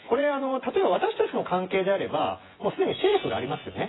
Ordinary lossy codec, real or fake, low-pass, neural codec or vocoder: AAC, 16 kbps; fake; 7.2 kHz; vocoder, 24 kHz, 100 mel bands, Vocos